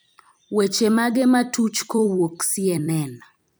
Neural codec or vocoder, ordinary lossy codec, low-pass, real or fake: vocoder, 44.1 kHz, 128 mel bands every 256 samples, BigVGAN v2; none; none; fake